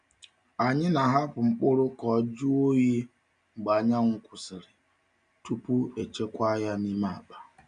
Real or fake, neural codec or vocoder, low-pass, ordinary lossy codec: real; none; 9.9 kHz; none